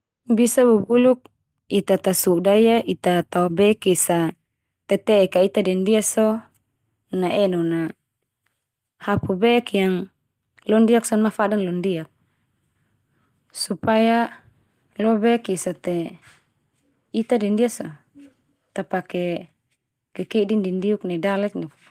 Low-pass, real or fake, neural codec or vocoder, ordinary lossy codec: 14.4 kHz; real; none; Opus, 24 kbps